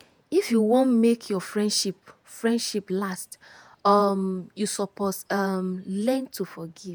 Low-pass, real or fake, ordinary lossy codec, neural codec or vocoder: none; fake; none; vocoder, 48 kHz, 128 mel bands, Vocos